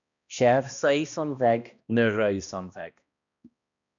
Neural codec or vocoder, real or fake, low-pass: codec, 16 kHz, 1 kbps, X-Codec, HuBERT features, trained on balanced general audio; fake; 7.2 kHz